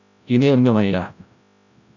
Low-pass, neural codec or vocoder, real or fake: 7.2 kHz; codec, 16 kHz, 0.5 kbps, FreqCodec, larger model; fake